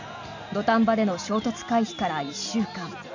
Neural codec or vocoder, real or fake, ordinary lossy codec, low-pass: none; real; none; 7.2 kHz